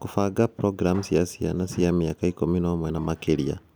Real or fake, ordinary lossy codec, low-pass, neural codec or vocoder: real; none; none; none